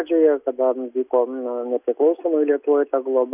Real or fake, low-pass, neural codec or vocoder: real; 3.6 kHz; none